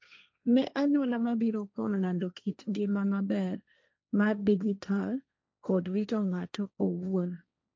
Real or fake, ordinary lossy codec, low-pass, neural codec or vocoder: fake; none; none; codec, 16 kHz, 1.1 kbps, Voila-Tokenizer